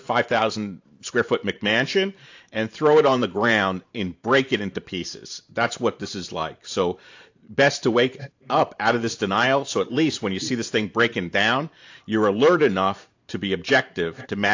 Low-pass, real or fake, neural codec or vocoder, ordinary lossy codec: 7.2 kHz; fake; vocoder, 44.1 kHz, 128 mel bands every 512 samples, BigVGAN v2; AAC, 48 kbps